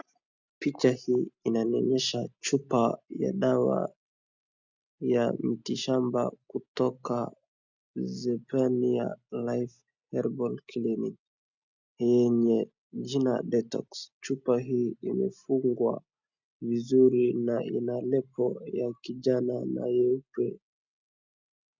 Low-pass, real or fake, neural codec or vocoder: 7.2 kHz; real; none